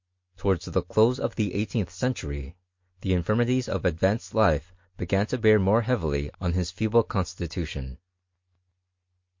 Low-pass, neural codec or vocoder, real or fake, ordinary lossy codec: 7.2 kHz; none; real; MP3, 48 kbps